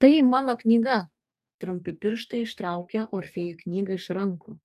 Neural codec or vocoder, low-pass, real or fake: codec, 44.1 kHz, 2.6 kbps, DAC; 14.4 kHz; fake